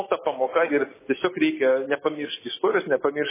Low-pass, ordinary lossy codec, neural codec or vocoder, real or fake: 3.6 kHz; MP3, 16 kbps; none; real